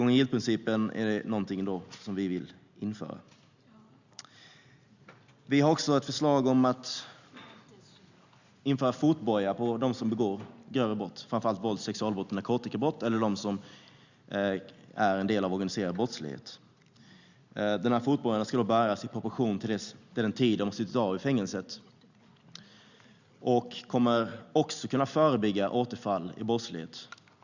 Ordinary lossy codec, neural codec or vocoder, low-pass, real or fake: Opus, 64 kbps; none; 7.2 kHz; real